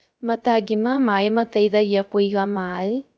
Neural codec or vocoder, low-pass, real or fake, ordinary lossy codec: codec, 16 kHz, 0.3 kbps, FocalCodec; none; fake; none